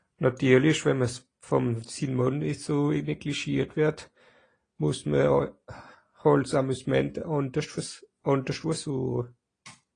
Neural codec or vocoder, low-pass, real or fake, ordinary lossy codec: none; 9.9 kHz; real; AAC, 32 kbps